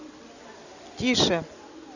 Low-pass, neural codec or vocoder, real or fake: 7.2 kHz; none; real